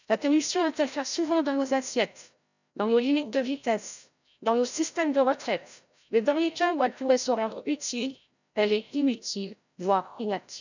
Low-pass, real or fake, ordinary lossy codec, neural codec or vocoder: 7.2 kHz; fake; none; codec, 16 kHz, 0.5 kbps, FreqCodec, larger model